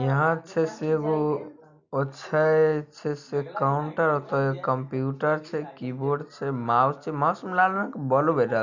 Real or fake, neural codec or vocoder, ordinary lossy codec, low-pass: real; none; none; 7.2 kHz